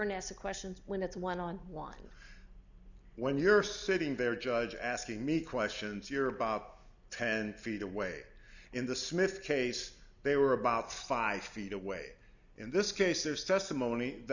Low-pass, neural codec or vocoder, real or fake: 7.2 kHz; none; real